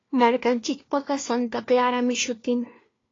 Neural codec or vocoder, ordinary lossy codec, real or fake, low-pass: codec, 16 kHz, 1 kbps, FunCodec, trained on LibriTTS, 50 frames a second; AAC, 32 kbps; fake; 7.2 kHz